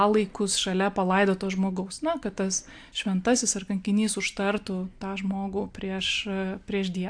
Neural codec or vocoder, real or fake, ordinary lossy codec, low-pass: none; real; AAC, 64 kbps; 9.9 kHz